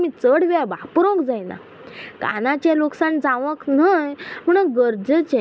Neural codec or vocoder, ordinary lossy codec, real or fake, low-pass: none; none; real; none